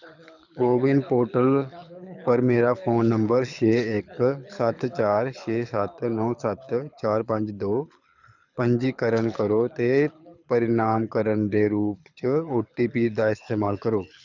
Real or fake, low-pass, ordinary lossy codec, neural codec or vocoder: fake; 7.2 kHz; none; codec, 24 kHz, 6 kbps, HILCodec